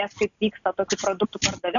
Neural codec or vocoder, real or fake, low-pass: none; real; 7.2 kHz